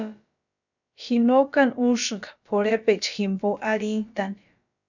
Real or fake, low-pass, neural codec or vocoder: fake; 7.2 kHz; codec, 16 kHz, about 1 kbps, DyCAST, with the encoder's durations